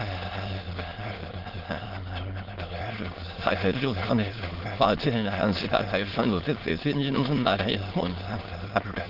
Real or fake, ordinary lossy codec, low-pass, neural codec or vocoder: fake; Opus, 16 kbps; 5.4 kHz; autoencoder, 22.05 kHz, a latent of 192 numbers a frame, VITS, trained on many speakers